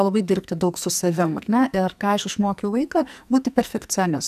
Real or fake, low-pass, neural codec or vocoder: fake; 14.4 kHz; codec, 44.1 kHz, 2.6 kbps, SNAC